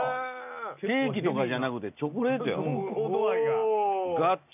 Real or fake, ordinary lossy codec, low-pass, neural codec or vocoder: real; none; 3.6 kHz; none